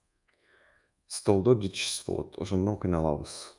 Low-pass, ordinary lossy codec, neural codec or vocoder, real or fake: 10.8 kHz; MP3, 96 kbps; codec, 24 kHz, 1.2 kbps, DualCodec; fake